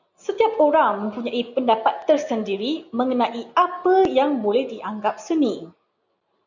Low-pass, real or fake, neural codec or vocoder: 7.2 kHz; real; none